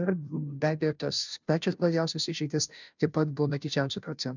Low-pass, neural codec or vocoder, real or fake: 7.2 kHz; codec, 16 kHz, 0.5 kbps, FunCodec, trained on Chinese and English, 25 frames a second; fake